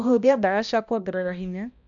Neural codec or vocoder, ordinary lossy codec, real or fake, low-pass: codec, 16 kHz, 1 kbps, X-Codec, HuBERT features, trained on balanced general audio; none; fake; 7.2 kHz